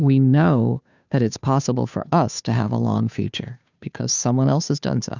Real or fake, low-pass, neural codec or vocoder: fake; 7.2 kHz; codec, 16 kHz, 2 kbps, FunCodec, trained on Chinese and English, 25 frames a second